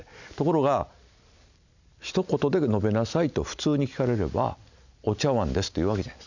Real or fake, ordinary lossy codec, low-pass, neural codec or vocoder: real; none; 7.2 kHz; none